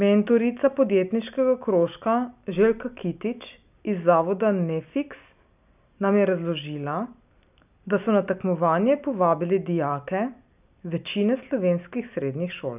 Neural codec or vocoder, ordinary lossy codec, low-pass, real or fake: none; none; 3.6 kHz; real